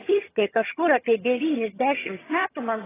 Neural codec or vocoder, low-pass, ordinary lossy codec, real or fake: vocoder, 22.05 kHz, 80 mel bands, HiFi-GAN; 3.6 kHz; AAC, 16 kbps; fake